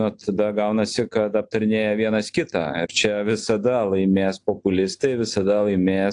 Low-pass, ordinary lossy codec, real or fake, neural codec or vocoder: 9.9 kHz; AAC, 64 kbps; real; none